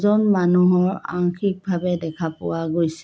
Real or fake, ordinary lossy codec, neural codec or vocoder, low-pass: real; none; none; none